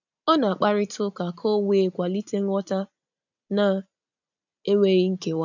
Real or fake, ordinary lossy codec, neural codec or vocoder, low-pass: real; none; none; 7.2 kHz